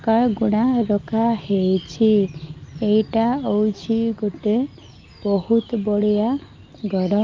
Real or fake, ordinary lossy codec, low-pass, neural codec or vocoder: real; Opus, 24 kbps; 7.2 kHz; none